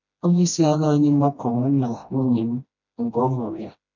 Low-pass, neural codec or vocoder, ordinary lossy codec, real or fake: 7.2 kHz; codec, 16 kHz, 1 kbps, FreqCodec, smaller model; none; fake